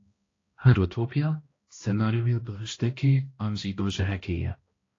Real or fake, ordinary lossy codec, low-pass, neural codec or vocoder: fake; AAC, 32 kbps; 7.2 kHz; codec, 16 kHz, 1 kbps, X-Codec, HuBERT features, trained on balanced general audio